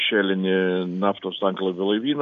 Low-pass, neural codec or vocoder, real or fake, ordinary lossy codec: 7.2 kHz; none; real; MP3, 64 kbps